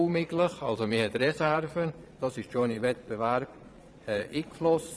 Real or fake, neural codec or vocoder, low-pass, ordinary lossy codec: fake; vocoder, 22.05 kHz, 80 mel bands, Vocos; none; none